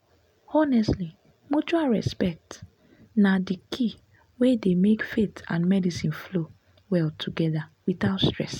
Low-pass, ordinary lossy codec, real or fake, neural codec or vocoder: 19.8 kHz; none; real; none